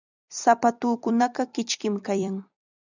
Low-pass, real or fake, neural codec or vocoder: 7.2 kHz; fake; vocoder, 44.1 kHz, 128 mel bands every 256 samples, BigVGAN v2